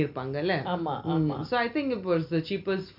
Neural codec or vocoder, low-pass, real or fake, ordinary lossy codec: none; 5.4 kHz; real; MP3, 48 kbps